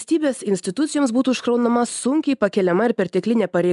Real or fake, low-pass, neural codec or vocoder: real; 10.8 kHz; none